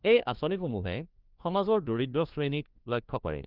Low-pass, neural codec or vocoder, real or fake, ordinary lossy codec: 5.4 kHz; codec, 16 kHz, 1 kbps, FunCodec, trained on LibriTTS, 50 frames a second; fake; Opus, 24 kbps